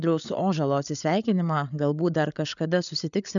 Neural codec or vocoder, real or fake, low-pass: codec, 16 kHz, 8 kbps, FreqCodec, larger model; fake; 7.2 kHz